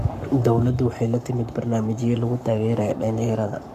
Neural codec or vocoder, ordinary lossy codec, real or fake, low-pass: codec, 44.1 kHz, 7.8 kbps, Pupu-Codec; none; fake; 14.4 kHz